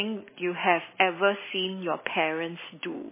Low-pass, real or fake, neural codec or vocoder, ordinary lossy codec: 3.6 kHz; fake; vocoder, 44.1 kHz, 128 mel bands every 256 samples, BigVGAN v2; MP3, 16 kbps